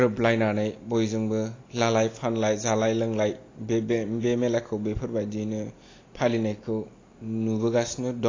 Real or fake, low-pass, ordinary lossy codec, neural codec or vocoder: real; 7.2 kHz; AAC, 32 kbps; none